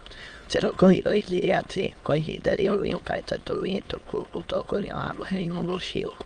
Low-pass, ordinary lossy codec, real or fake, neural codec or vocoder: 9.9 kHz; Opus, 24 kbps; fake; autoencoder, 22.05 kHz, a latent of 192 numbers a frame, VITS, trained on many speakers